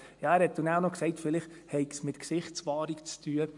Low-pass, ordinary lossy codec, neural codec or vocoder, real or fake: 10.8 kHz; MP3, 64 kbps; none; real